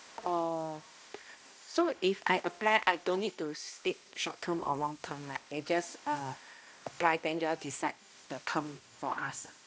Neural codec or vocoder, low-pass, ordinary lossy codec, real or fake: codec, 16 kHz, 1 kbps, X-Codec, HuBERT features, trained on balanced general audio; none; none; fake